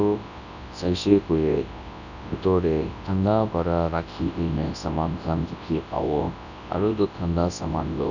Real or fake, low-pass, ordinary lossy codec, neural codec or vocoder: fake; 7.2 kHz; none; codec, 24 kHz, 0.9 kbps, WavTokenizer, large speech release